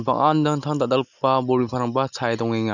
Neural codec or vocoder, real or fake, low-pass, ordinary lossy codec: none; real; 7.2 kHz; none